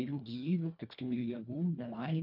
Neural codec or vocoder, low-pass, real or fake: codec, 24 kHz, 1.5 kbps, HILCodec; 5.4 kHz; fake